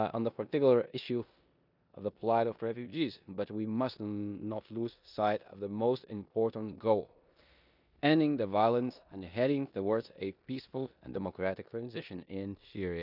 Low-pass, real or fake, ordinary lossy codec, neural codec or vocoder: 5.4 kHz; fake; none; codec, 16 kHz in and 24 kHz out, 0.9 kbps, LongCat-Audio-Codec, four codebook decoder